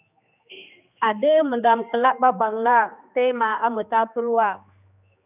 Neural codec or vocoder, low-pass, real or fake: codec, 16 kHz, 2 kbps, X-Codec, HuBERT features, trained on general audio; 3.6 kHz; fake